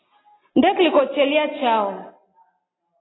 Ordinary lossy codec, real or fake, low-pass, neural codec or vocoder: AAC, 16 kbps; real; 7.2 kHz; none